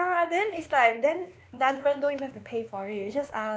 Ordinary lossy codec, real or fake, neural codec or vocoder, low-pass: none; fake; codec, 16 kHz, 2 kbps, X-Codec, WavLM features, trained on Multilingual LibriSpeech; none